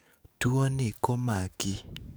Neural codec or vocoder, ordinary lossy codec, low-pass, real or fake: codec, 44.1 kHz, 7.8 kbps, DAC; none; none; fake